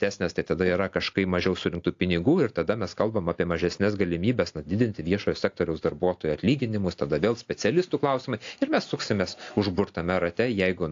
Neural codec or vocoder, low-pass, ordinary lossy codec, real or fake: none; 7.2 kHz; AAC, 48 kbps; real